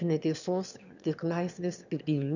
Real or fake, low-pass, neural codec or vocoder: fake; 7.2 kHz; autoencoder, 22.05 kHz, a latent of 192 numbers a frame, VITS, trained on one speaker